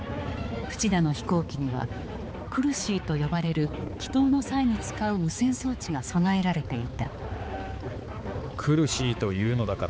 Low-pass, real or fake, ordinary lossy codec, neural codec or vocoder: none; fake; none; codec, 16 kHz, 4 kbps, X-Codec, HuBERT features, trained on balanced general audio